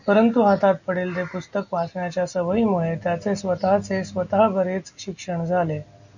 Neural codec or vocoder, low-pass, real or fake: none; 7.2 kHz; real